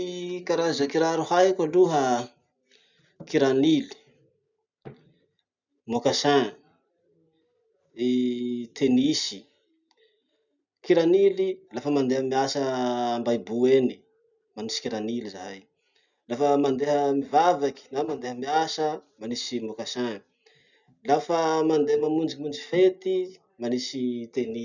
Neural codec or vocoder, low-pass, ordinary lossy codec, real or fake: none; 7.2 kHz; none; real